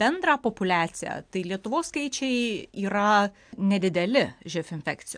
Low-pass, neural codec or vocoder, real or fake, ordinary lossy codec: 9.9 kHz; none; real; AAC, 64 kbps